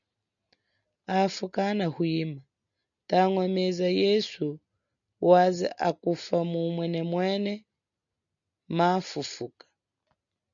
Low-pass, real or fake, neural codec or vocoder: 7.2 kHz; real; none